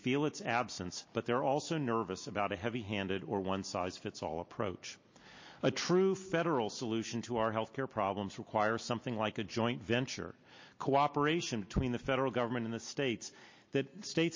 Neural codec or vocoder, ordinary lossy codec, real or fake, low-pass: none; MP3, 32 kbps; real; 7.2 kHz